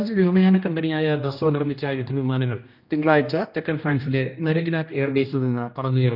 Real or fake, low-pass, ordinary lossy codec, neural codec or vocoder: fake; 5.4 kHz; none; codec, 16 kHz, 1 kbps, X-Codec, HuBERT features, trained on general audio